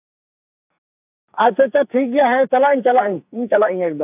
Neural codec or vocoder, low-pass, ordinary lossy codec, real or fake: codec, 44.1 kHz, 2.6 kbps, SNAC; 3.6 kHz; AAC, 32 kbps; fake